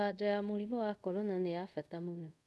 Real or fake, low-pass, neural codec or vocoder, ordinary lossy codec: fake; none; codec, 24 kHz, 0.5 kbps, DualCodec; none